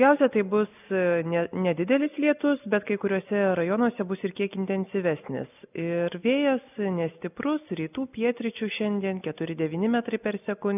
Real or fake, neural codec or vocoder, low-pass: real; none; 3.6 kHz